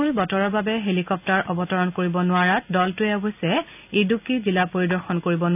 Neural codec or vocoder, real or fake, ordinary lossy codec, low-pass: none; real; none; 3.6 kHz